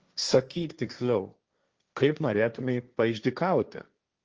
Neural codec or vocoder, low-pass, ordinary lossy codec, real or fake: codec, 16 kHz, 1.1 kbps, Voila-Tokenizer; 7.2 kHz; Opus, 24 kbps; fake